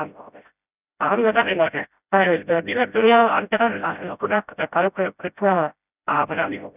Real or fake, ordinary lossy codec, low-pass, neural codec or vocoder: fake; none; 3.6 kHz; codec, 16 kHz, 0.5 kbps, FreqCodec, smaller model